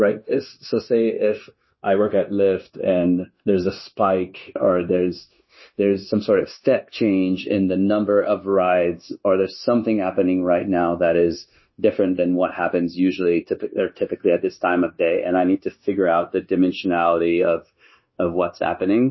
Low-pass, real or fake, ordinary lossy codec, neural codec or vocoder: 7.2 kHz; fake; MP3, 24 kbps; codec, 16 kHz, 0.9 kbps, LongCat-Audio-Codec